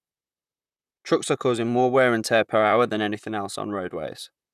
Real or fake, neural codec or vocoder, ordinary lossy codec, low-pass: fake; vocoder, 44.1 kHz, 128 mel bands, Pupu-Vocoder; none; 14.4 kHz